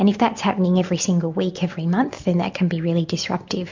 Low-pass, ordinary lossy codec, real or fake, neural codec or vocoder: 7.2 kHz; MP3, 48 kbps; real; none